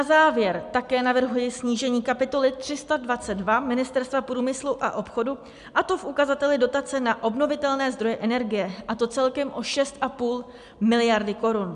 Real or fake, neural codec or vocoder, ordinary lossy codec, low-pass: real; none; MP3, 96 kbps; 10.8 kHz